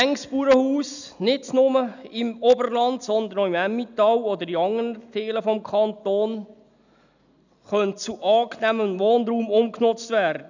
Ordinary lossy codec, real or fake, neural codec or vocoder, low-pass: none; real; none; 7.2 kHz